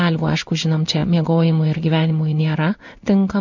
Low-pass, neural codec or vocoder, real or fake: 7.2 kHz; codec, 16 kHz in and 24 kHz out, 1 kbps, XY-Tokenizer; fake